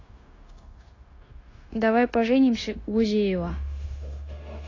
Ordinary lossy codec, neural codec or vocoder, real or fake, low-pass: AAC, 32 kbps; codec, 16 kHz, 0.9 kbps, LongCat-Audio-Codec; fake; 7.2 kHz